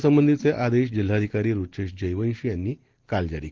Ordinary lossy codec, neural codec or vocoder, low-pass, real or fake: Opus, 16 kbps; none; 7.2 kHz; real